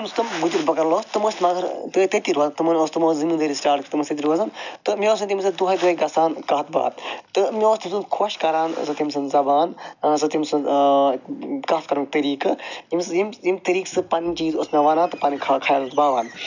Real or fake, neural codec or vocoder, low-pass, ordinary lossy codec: real; none; 7.2 kHz; none